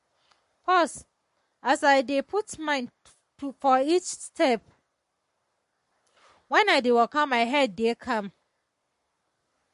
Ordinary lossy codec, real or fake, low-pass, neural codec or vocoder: MP3, 48 kbps; fake; 14.4 kHz; codec, 44.1 kHz, 7.8 kbps, Pupu-Codec